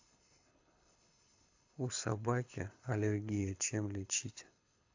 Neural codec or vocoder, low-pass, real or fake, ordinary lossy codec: codec, 24 kHz, 6 kbps, HILCodec; 7.2 kHz; fake; AAC, 48 kbps